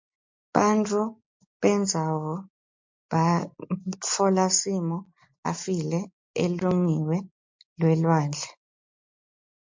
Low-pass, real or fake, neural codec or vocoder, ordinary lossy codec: 7.2 kHz; real; none; MP3, 48 kbps